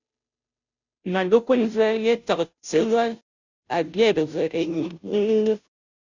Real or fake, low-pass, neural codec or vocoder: fake; 7.2 kHz; codec, 16 kHz, 0.5 kbps, FunCodec, trained on Chinese and English, 25 frames a second